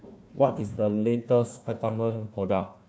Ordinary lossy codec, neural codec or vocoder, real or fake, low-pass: none; codec, 16 kHz, 1 kbps, FunCodec, trained on Chinese and English, 50 frames a second; fake; none